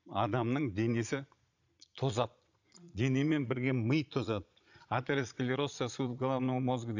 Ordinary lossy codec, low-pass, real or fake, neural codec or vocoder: none; 7.2 kHz; fake; vocoder, 22.05 kHz, 80 mel bands, Vocos